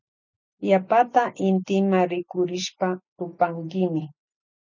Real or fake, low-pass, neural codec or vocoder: real; 7.2 kHz; none